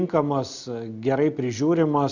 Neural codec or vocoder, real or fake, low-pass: none; real; 7.2 kHz